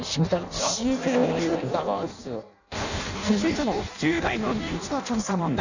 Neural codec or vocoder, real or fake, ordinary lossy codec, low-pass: codec, 16 kHz in and 24 kHz out, 0.6 kbps, FireRedTTS-2 codec; fake; none; 7.2 kHz